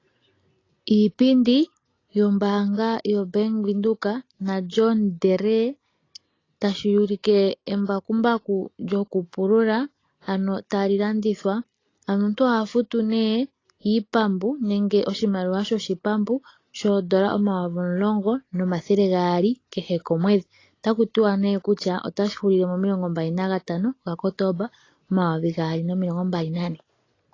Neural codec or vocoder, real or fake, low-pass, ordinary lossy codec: none; real; 7.2 kHz; AAC, 32 kbps